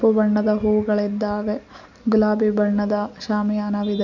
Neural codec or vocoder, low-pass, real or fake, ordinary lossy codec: none; 7.2 kHz; real; none